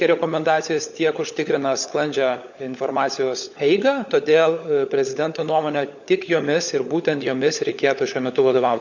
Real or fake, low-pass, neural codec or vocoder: fake; 7.2 kHz; codec, 16 kHz, 16 kbps, FunCodec, trained on LibriTTS, 50 frames a second